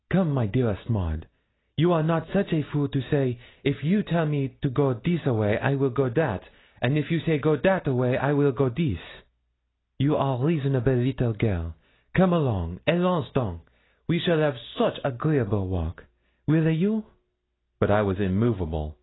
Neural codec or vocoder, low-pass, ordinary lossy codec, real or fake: none; 7.2 kHz; AAC, 16 kbps; real